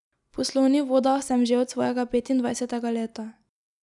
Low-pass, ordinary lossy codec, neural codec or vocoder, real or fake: 10.8 kHz; none; none; real